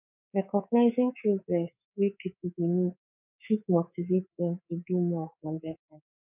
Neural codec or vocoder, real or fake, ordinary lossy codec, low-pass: codec, 24 kHz, 3.1 kbps, DualCodec; fake; none; 3.6 kHz